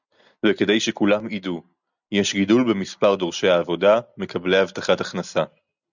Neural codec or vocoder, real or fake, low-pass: none; real; 7.2 kHz